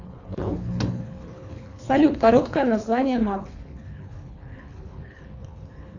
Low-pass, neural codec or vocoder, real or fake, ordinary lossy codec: 7.2 kHz; codec, 24 kHz, 3 kbps, HILCodec; fake; AAC, 48 kbps